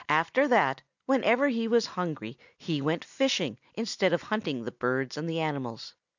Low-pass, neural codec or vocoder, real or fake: 7.2 kHz; none; real